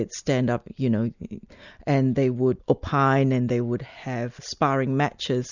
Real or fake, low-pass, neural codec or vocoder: real; 7.2 kHz; none